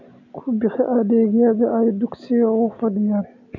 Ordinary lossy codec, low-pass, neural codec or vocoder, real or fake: none; 7.2 kHz; none; real